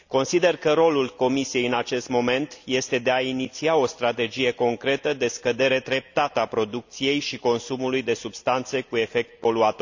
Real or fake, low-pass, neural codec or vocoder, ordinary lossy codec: real; 7.2 kHz; none; none